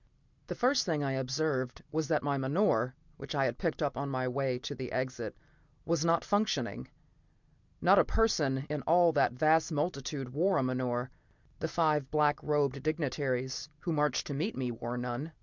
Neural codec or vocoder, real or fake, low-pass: none; real; 7.2 kHz